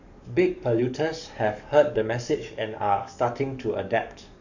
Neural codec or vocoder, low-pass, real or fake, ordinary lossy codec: codec, 44.1 kHz, 7.8 kbps, DAC; 7.2 kHz; fake; none